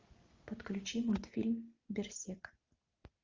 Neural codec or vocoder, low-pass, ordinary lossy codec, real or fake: none; 7.2 kHz; Opus, 32 kbps; real